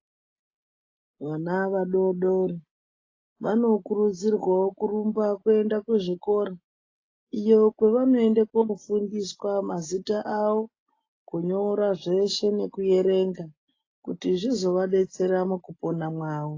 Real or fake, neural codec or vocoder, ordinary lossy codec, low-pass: real; none; AAC, 32 kbps; 7.2 kHz